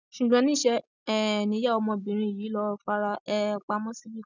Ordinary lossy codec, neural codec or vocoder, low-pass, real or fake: none; none; 7.2 kHz; real